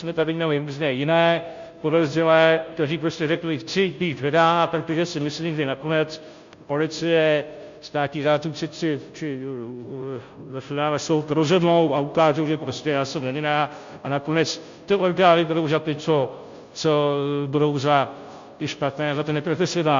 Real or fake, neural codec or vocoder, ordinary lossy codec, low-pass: fake; codec, 16 kHz, 0.5 kbps, FunCodec, trained on Chinese and English, 25 frames a second; MP3, 48 kbps; 7.2 kHz